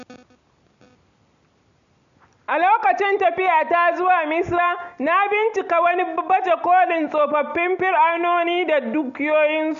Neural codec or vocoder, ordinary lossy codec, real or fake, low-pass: none; none; real; 7.2 kHz